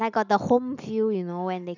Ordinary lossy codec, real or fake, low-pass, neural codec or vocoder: none; real; 7.2 kHz; none